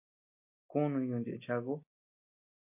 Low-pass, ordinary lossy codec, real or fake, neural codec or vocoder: 3.6 kHz; MP3, 32 kbps; real; none